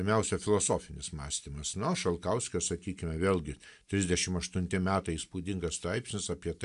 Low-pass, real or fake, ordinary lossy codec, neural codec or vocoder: 10.8 kHz; real; AAC, 96 kbps; none